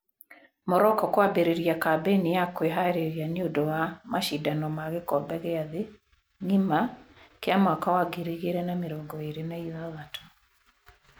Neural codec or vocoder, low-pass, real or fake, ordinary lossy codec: none; none; real; none